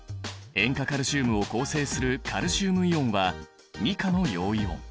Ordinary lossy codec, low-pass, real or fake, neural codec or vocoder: none; none; real; none